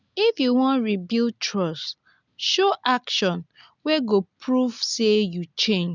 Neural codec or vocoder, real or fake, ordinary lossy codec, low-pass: none; real; none; 7.2 kHz